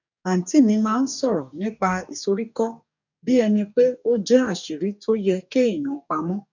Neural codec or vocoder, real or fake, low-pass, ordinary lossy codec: codec, 44.1 kHz, 2.6 kbps, DAC; fake; 7.2 kHz; none